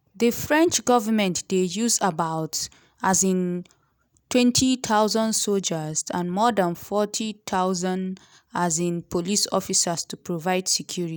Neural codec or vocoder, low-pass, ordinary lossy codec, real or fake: none; none; none; real